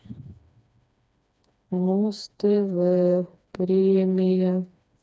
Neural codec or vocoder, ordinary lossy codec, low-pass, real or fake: codec, 16 kHz, 2 kbps, FreqCodec, smaller model; none; none; fake